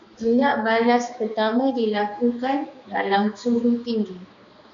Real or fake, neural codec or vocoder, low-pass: fake; codec, 16 kHz, 2 kbps, X-Codec, HuBERT features, trained on balanced general audio; 7.2 kHz